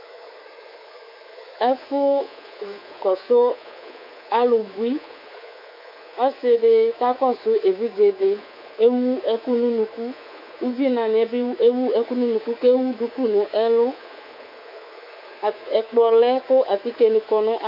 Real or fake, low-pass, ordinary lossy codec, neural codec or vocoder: fake; 5.4 kHz; MP3, 48 kbps; codec, 24 kHz, 3.1 kbps, DualCodec